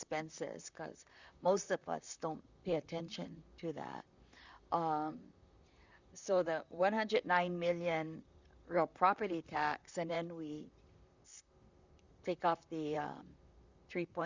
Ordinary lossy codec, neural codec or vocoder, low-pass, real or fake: Opus, 64 kbps; vocoder, 44.1 kHz, 128 mel bands, Pupu-Vocoder; 7.2 kHz; fake